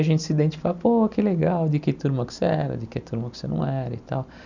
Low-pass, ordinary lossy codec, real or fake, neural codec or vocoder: 7.2 kHz; none; real; none